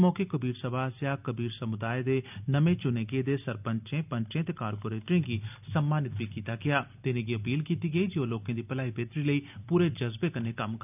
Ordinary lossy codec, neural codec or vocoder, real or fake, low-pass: none; none; real; 3.6 kHz